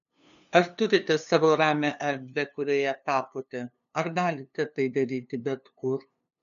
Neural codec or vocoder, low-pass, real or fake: codec, 16 kHz, 2 kbps, FunCodec, trained on LibriTTS, 25 frames a second; 7.2 kHz; fake